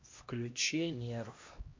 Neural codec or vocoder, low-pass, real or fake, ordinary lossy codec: codec, 16 kHz, 1 kbps, X-Codec, HuBERT features, trained on LibriSpeech; 7.2 kHz; fake; MP3, 48 kbps